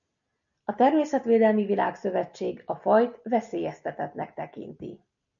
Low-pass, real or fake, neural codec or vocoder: 7.2 kHz; real; none